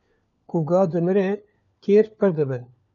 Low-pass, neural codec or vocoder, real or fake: 7.2 kHz; codec, 16 kHz, 4 kbps, FunCodec, trained on LibriTTS, 50 frames a second; fake